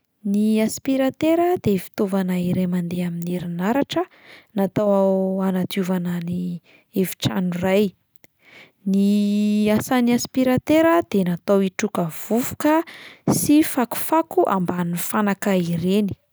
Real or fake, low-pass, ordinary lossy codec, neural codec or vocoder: real; none; none; none